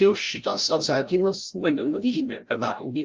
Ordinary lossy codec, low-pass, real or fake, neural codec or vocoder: Opus, 24 kbps; 7.2 kHz; fake; codec, 16 kHz, 0.5 kbps, FreqCodec, larger model